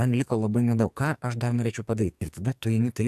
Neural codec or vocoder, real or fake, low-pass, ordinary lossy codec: codec, 44.1 kHz, 2.6 kbps, SNAC; fake; 14.4 kHz; AAC, 96 kbps